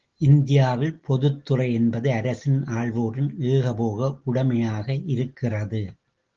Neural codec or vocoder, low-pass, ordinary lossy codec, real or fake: none; 7.2 kHz; Opus, 16 kbps; real